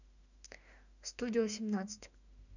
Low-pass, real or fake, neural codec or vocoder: 7.2 kHz; fake; autoencoder, 48 kHz, 32 numbers a frame, DAC-VAE, trained on Japanese speech